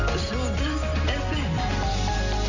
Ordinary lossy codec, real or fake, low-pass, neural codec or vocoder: Opus, 64 kbps; real; 7.2 kHz; none